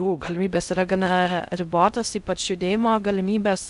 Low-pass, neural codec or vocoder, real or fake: 10.8 kHz; codec, 16 kHz in and 24 kHz out, 0.6 kbps, FocalCodec, streaming, 4096 codes; fake